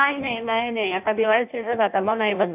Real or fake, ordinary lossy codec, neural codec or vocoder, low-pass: fake; AAC, 32 kbps; codec, 16 kHz in and 24 kHz out, 0.6 kbps, FireRedTTS-2 codec; 3.6 kHz